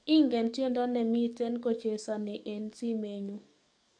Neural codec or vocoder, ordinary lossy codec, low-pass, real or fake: codec, 44.1 kHz, 7.8 kbps, DAC; MP3, 64 kbps; 9.9 kHz; fake